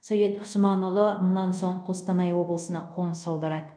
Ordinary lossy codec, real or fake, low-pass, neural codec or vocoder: none; fake; 9.9 kHz; codec, 24 kHz, 0.5 kbps, DualCodec